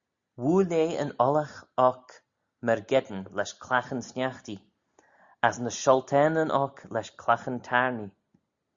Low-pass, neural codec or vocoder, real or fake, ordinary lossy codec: 7.2 kHz; none; real; Opus, 64 kbps